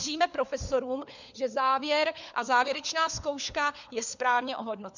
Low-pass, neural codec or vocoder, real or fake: 7.2 kHz; codec, 16 kHz, 4 kbps, FunCodec, trained on LibriTTS, 50 frames a second; fake